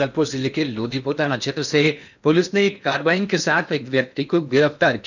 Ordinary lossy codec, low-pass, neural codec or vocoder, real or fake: none; 7.2 kHz; codec, 16 kHz in and 24 kHz out, 0.8 kbps, FocalCodec, streaming, 65536 codes; fake